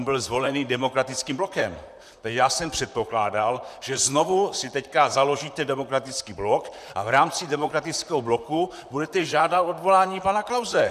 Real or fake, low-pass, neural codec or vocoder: fake; 14.4 kHz; vocoder, 44.1 kHz, 128 mel bands, Pupu-Vocoder